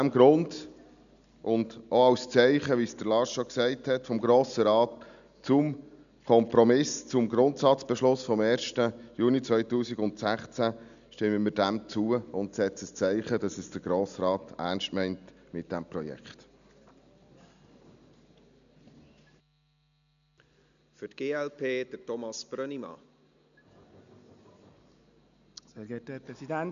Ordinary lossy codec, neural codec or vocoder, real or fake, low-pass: none; none; real; 7.2 kHz